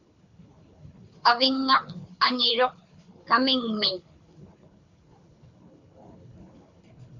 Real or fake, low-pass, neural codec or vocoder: fake; 7.2 kHz; codec, 24 kHz, 6 kbps, HILCodec